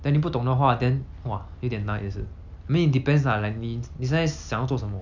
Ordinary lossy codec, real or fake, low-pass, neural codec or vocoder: none; real; 7.2 kHz; none